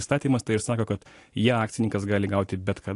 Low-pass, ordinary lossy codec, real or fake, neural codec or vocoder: 10.8 kHz; AAC, 48 kbps; real; none